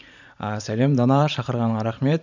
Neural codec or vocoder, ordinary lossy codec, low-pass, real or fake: vocoder, 44.1 kHz, 128 mel bands every 512 samples, BigVGAN v2; none; 7.2 kHz; fake